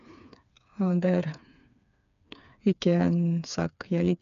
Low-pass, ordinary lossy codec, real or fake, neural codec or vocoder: 7.2 kHz; none; fake; codec, 16 kHz, 4 kbps, FreqCodec, smaller model